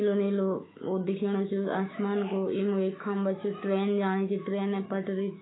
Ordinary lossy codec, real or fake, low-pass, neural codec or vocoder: AAC, 16 kbps; fake; 7.2 kHz; autoencoder, 48 kHz, 128 numbers a frame, DAC-VAE, trained on Japanese speech